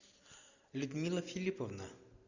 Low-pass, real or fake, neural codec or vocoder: 7.2 kHz; real; none